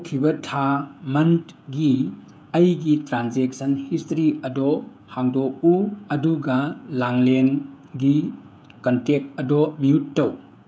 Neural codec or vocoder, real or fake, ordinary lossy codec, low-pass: codec, 16 kHz, 16 kbps, FreqCodec, smaller model; fake; none; none